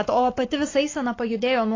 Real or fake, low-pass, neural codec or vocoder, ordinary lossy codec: fake; 7.2 kHz; codec, 44.1 kHz, 7.8 kbps, Pupu-Codec; AAC, 32 kbps